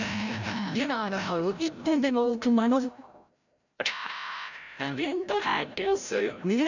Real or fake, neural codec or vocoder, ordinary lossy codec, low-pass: fake; codec, 16 kHz, 0.5 kbps, FreqCodec, larger model; none; 7.2 kHz